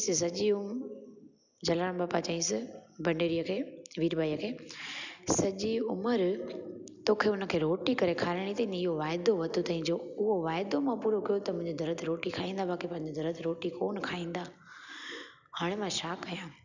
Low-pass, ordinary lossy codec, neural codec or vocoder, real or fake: 7.2 kHz; none; none; real